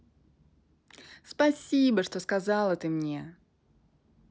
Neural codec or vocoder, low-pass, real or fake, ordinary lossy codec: none; none; real; none